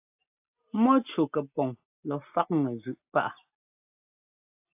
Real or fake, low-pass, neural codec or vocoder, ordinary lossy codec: real; 3.6 kHz; none; AAC, 32 kbps